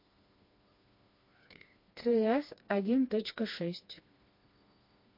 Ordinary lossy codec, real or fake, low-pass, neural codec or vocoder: MP3, 32 kbps; fake; 5.4 kHz; codec, 16 kHz, 2 kbps, FreqCodec, smaller model